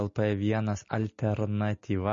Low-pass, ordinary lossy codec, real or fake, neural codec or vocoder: 7.2 kHz; MP3, 32 kbps; real; none